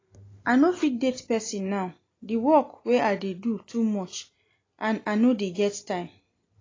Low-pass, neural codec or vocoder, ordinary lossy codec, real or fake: 7.2 kHz; none; AAC, 32 kbps; real